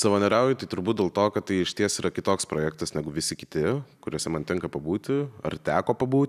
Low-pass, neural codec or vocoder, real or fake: 14.4 kHz; none; real